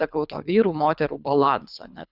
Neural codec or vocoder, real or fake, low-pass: codec, 24 kHz, 3 kbps, HILCodec; fake; 5.4 kHz